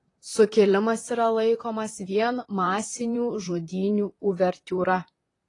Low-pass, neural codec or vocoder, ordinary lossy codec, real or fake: 10.8 kHz; vocoder, 44.1 kHz, 128 mel bands, Pupu-Vocoder; AAC, 32 kbps; fake